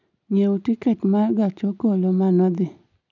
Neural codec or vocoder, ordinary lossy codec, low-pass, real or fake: none; none; 7.2 kHz; real